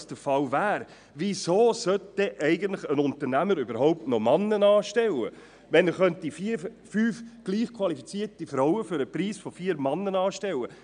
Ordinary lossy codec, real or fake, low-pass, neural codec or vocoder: none; real; 9.9 kHz; none